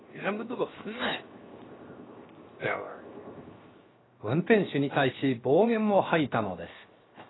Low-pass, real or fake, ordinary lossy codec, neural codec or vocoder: 7.2 kHz; fake; AAC, 16 kbps; codec, 16 kHz, 0.7 kbps, FocalCodec